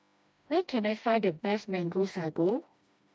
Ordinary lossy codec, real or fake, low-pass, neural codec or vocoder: none; fake; none; codec, 16 kHz, 1 kbps, FreqCodec, smaller model